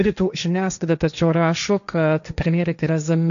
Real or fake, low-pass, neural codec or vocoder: fake; 7.2 kHz; codec, 16 kHz, 1.1 kbps, Voila-Tokenizer